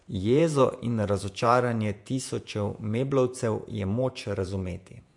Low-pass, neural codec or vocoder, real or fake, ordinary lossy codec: 10.8 kHz; vocoder, 48 kHz, 128 mel bands, Vocos; fake; MP3, 64 kbps